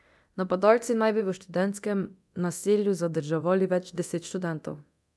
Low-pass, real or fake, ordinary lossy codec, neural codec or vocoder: none; fake; none; codec, 24 kHz, 0.9 kbps, DualCodec